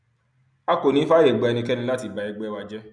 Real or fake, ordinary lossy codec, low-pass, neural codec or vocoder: real; none; 9.9 kHz; none